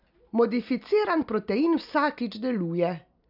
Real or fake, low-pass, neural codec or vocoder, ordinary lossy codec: real; 5.4 kHz; none; none